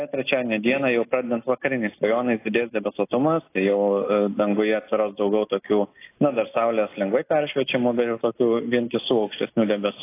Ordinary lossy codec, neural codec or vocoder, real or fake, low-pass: AAC, 24 kbps; none; real; 3.6 kHz